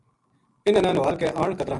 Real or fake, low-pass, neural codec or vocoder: real; 10.8 kHz; none